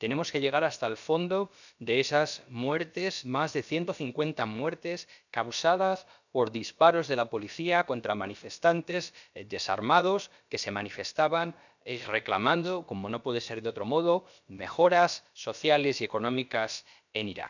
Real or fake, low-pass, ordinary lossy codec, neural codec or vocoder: fake; 7.2 kHz; none; codec, 16 kHz, about 1 kbps, DyCAST, with the encoder's durations